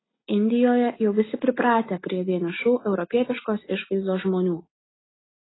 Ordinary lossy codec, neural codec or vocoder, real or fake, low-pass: AAC, 16 kbps; none; real; 7.2 kHz